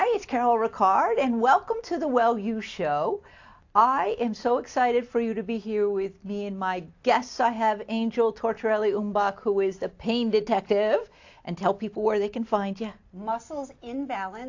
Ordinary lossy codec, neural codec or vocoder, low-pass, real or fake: AAC, 48 kbps; none; 7.2 kHz; real